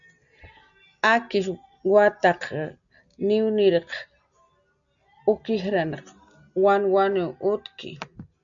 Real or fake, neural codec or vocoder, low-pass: real; none; 7.2 kHz